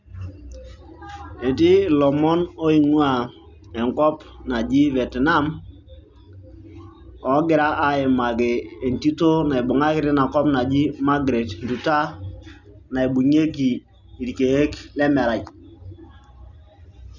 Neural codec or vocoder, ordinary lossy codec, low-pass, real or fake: none; none; 7.2 kHz; real